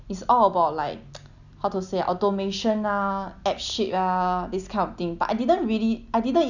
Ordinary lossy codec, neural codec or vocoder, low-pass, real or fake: none; none; 7.2 kHz; real